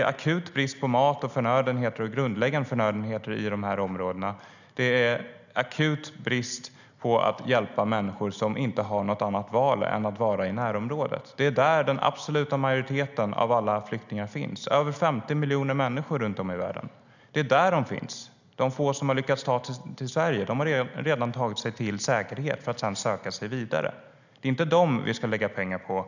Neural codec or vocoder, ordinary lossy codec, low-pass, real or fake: none; none; 7.2 kHz; real